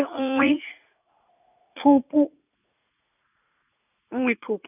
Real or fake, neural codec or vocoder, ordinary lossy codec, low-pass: fake; vocoder, 44.1 kHz, 80 mel bands, Vocos; none; 3.6 kHz